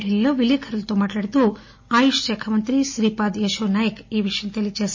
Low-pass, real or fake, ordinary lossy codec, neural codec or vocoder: 7.2 kHz; real; MP3, 32 kbps; none